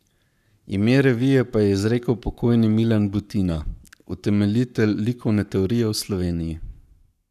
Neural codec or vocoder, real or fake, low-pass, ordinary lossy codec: codec, 44.1 kHz, 7.8 kbps, Pupu-Codec; fake; 14.4 kHz; none